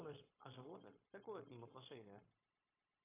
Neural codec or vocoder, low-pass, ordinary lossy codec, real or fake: codec, 16 kHz, 0.9 kbps, LongCat-Audio-Codec; 3.6 kHz; AAC, 24 kbps; fake